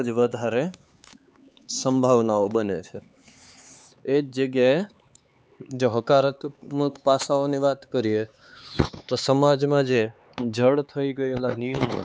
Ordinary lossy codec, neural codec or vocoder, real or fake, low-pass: none; codec, 16 kHz, 4 kbps, X-Codec, HuBERT features, trained on LibriSpeech; fake; none